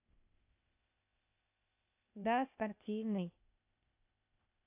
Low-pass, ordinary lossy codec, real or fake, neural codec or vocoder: 3.6 kHz; AAC, 32 kbps; fake; codec, 16 kHz, 0.8 kbps, ZipCodec